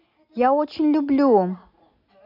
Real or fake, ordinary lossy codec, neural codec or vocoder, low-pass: real; none; none; 5.4 kHz